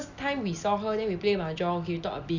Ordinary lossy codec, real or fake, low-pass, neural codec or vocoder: none; real; 7.2 kHz; none